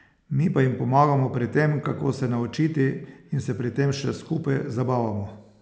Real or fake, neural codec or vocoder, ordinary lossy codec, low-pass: real; none; none; none